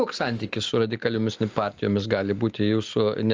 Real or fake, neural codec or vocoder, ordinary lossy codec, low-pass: real; none; Opus, 24 kbps; 7.2 kHz